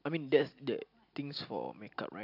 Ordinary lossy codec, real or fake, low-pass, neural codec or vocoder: AAC, 48 kbps; real; 5.4 kHz; none